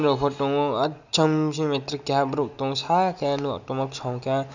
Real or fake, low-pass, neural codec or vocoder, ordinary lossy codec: real; 7.2 kHz; none; none